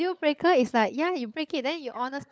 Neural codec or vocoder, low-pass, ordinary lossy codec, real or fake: codec, 16 kHz, 16 kbps, FunCodec, trained on LibriTTS, 50 frames a second; none; none; fake